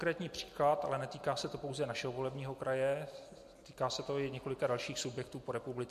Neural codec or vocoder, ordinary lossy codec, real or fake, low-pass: none; MP3, 64 kbps; real; 14.4 kHz